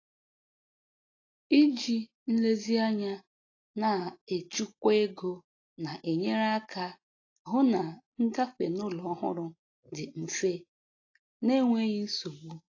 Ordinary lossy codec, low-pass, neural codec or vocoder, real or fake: AAC, 32 kbps; 7.2 kHz; none; real